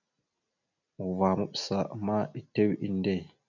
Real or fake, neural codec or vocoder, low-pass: real; none; 7.2 kHz